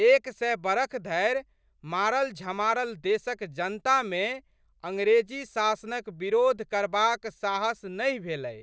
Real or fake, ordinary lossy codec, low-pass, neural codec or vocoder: real; none; none; none